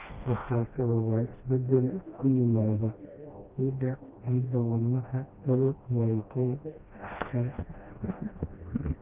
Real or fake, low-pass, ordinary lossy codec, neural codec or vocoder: fake; 3.6 kHz; Opus, 24 kbps; codec, 16 kHz, 1 kbps, FreqCodec, smaller model